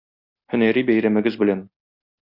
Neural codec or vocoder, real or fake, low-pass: none; real; 5.4 kHz